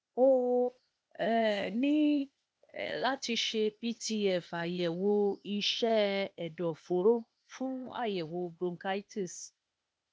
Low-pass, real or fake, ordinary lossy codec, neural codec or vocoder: none; fake; none; codec, 16 kHz, 0.8 kbps, ZipCodec